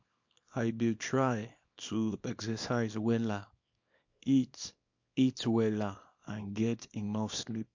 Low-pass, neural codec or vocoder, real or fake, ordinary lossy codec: 7.2 kHz; codec, 24 kHz, 0.9 kbps, WavTokenizer, small release; fake; MP3, 48 kbps